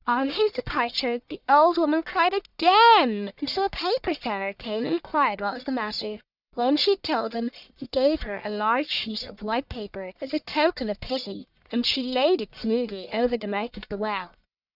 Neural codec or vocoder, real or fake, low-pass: codec, 44.1 kHz, 1.7 kbps, Pupu-Codec; fake; 5.4 kHz